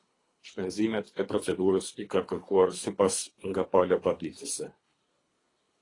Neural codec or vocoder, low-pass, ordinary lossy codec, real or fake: codec, 24 kHz, 3 kbps, HILCodec; 10.8 kHz; AAC, 32 kbps; fake